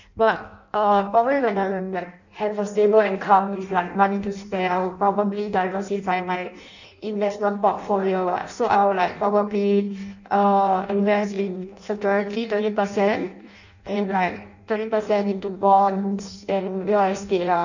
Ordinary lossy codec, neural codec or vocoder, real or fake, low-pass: none; codec, 16 kHz in and 24 kHz out, 0.6 kbps, FireRedTTS-2 codec; fake; 7.2 kHz